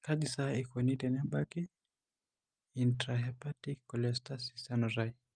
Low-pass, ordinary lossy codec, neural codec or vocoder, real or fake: 9.9 kHz; Opus, 32 kbps; none; real